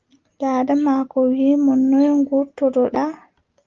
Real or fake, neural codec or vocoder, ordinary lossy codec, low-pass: real; none; Opus, 24 kbps; 7.2 kHz